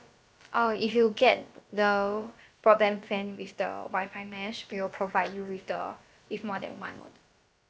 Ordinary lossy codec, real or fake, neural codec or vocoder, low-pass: none; fake; codec, 16 kHz, about 1 kbps, DyCAST, with the encoder's durations; none